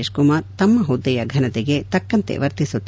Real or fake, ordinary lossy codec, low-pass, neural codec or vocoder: real; none; none; none